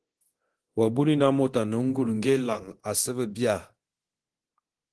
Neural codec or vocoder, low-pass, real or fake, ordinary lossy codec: codec, 24 kHz, 0.9 kbps, DualCodec; 10.8 kHz; fake; Opus, 16 kbps